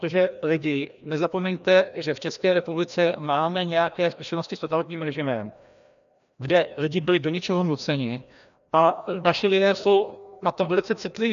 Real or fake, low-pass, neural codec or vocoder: fake; 7.2 kHz; codec, 16 kHz, 1 kbps, FreqCodec, larger model